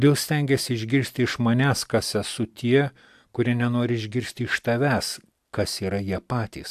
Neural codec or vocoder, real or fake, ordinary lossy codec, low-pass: none; real; AAC, 96 kbps; 14.4 kHz